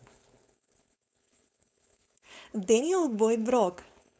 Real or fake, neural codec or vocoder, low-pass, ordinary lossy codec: fake; codec, 16 kHz, 4.8 kbps, FACodec; none; none